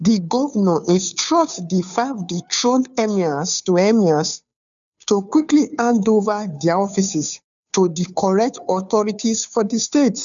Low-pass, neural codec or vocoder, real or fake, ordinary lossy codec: 7.2 kHz; codec, 16 kHz, 2 kbps, FunCodec, trained on Chinese and English, 25 frames a second; fake; none